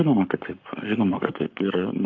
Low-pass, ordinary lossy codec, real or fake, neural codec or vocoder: 7.2 kHz; AAC, 48 kbps; fake; codec, 44.1 kHz, 7.8 kbps, Pupu-Codec